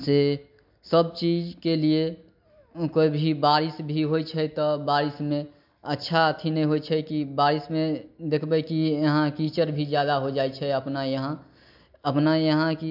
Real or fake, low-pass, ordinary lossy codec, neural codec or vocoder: real; 5.4 kHz; MP3, 48 kbps; none